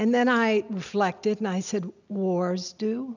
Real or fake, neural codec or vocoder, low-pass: real; none; 7.2 kHz